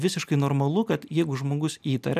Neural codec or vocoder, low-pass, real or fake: none; 14.4 kHz; real